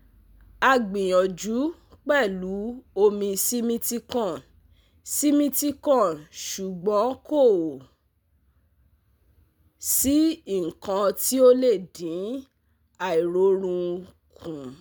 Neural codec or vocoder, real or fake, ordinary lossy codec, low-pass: none; real; none; none